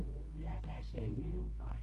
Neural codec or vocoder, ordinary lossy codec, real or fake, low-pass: codec, 24 kHz, 0.9 kbps, WavTokenizer, medium music audio release; Opus, 24 kbps; fake; 10.8 kHz